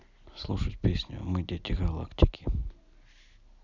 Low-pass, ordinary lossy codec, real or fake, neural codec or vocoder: 7.2 kHz; none; real; none